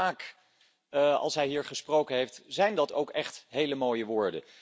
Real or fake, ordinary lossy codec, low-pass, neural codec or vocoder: real; none; none; none